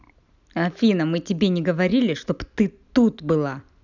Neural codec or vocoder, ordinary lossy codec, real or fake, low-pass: none; none; real; 7.2 kHz